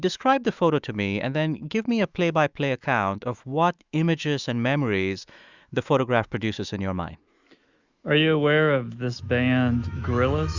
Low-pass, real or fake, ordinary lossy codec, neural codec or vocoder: 7.2 kHz; fake; Opus, 64 kbps; autoencoder, 48 kHz, 128 numbers a frame, DAC-VAE, trained on Japanese speech